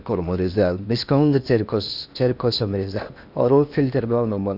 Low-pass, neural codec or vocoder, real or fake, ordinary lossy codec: 5.4 kHz; codec, 16 kHz in and 24 kHz out, 0.6 kbps, FocalCodec, streaming, 4096 codes; fake; none